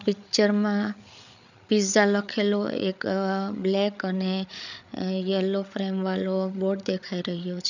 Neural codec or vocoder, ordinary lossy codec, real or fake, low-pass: codec, 16 kHz, 8 kbps, FreqCodec, larger model; none; fake; 7.2 kHz